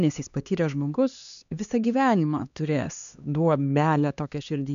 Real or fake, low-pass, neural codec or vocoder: fake; 7.2 kHz; codec, 16 kHz, 2 kbps, X-Codec, HuBERT features, trained on LibriSpeech